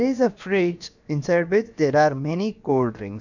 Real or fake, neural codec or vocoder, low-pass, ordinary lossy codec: fake; codec, 16 kHz, about 1 kbps, DyCAST, with the encoder's durations; 7.2 kHz; none